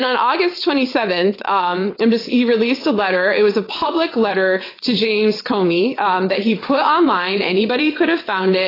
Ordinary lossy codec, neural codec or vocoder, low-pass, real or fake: AAC, 24 kbps; vocoder, 22.05 kHz, 80 mel bands, WaveNeXt; 5.4 kHz; fake